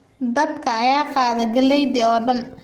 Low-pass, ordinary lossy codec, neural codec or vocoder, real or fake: 19.8 kHz; Opus, 16 kbps; codec, 44.1 kHz, 7.8 kbps, DAC; fake